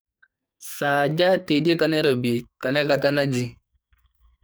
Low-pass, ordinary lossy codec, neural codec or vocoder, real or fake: none; none; codec, 44.1 kHz, 2.6 kbps, SNAC; fake